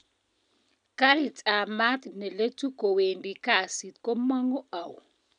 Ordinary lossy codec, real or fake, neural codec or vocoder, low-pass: none; real; none; 9.9 kHz